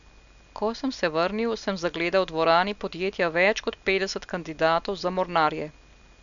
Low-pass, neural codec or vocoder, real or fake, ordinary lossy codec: 7.2 kHz; none; real; none